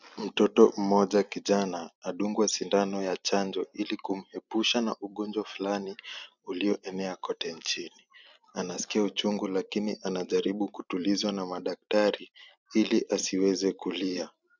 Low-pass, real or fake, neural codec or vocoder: 7.2 kHz; fake; vocoder, 44.1 kHz, 128 mel bands every 256 samples, BigVGAN v2